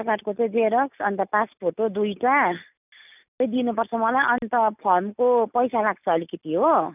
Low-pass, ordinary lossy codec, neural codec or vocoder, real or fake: 3.6 kHz; none; none; real